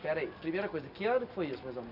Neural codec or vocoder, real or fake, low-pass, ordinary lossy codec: none; real; 5.4 kHz; none